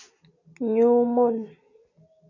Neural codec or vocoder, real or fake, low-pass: none; real; 7.2 kHz